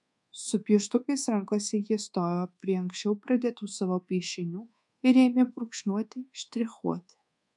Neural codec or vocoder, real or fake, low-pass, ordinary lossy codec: codec, 24 kHz, 1.2 kbps, DualCodec; fake; 10.8 kHz; MP3, 96 kbps